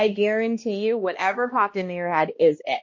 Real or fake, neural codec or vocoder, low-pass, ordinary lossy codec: fake; codec, 16 kHz, 1 kbps, X-Codec, HuBERT features, trained on balanced general audio; 7.2 kHz; MP3, 32 kbps